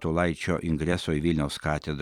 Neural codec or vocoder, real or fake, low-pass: vocoder, 44.1 kHz, 128 mel bands every 256 samples, BigVGAN v2; fake; 19.8 kHz